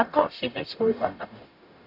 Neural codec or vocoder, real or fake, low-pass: codec, 44.1 kHz, 0.9 kbps, DAC; fake; 5.4 kHz